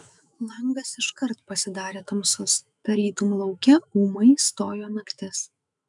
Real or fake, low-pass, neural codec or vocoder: fake; 10.8 kHz; autoencoder, 48 kHz, 128 numbers a frame, DAC-VAE, trained on Japanese speech